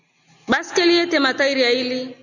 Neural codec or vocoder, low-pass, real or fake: none; 7.2 kHz; real